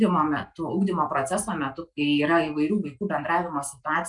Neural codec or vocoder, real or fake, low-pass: none; real; 10.8 kHz